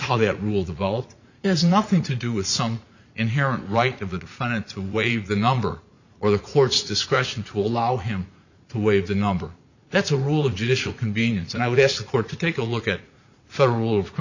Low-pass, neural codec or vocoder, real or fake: 7.2 kHz; vocoder, 44.1 kHz, 80 mel bands, Vocos; fake